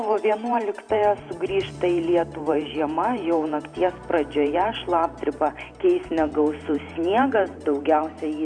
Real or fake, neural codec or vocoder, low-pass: fake; vocoder, 44.1 kHz, 128 mel bands every 512 samples, BigVGAN v2; 9.9 kHz